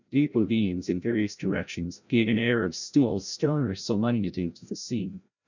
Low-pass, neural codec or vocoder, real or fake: 7.2 kHz; codec, 16 kHz, 0.5 kbps, FreqCodec, larger model; fake